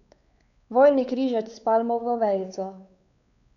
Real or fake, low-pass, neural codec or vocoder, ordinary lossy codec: fake; 7.2 kHz; codec, 16 kHz, 4 kbps, X-Codec, WavLM features, trained on Multilingual LibriSpeech; none